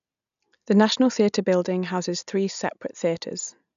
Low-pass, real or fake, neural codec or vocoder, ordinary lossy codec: 7.2 kHz; real; none; none